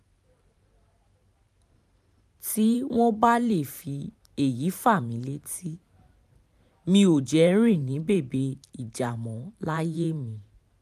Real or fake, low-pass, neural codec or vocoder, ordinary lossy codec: fake; 14.4 kHz; vocoder, 44.1 kHz, 128 mel bands every 512 samples, BigVGAN v2; none